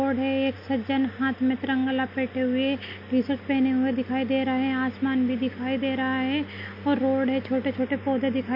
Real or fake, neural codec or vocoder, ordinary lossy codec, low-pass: real; none; none; 5.4 kHz